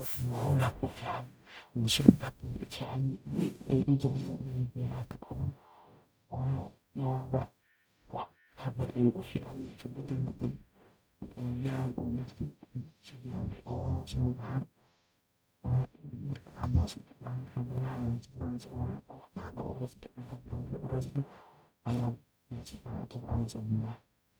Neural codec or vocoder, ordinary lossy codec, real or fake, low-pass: codec, 44.1 kHz, 0.9 kbps, DAC; none; fake; none